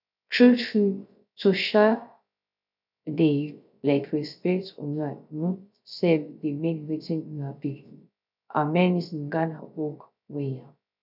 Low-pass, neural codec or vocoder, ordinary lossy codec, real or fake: 5.4 kHz; codec, 16 kHz, 0.3 kbps, FocalCodec; none; fake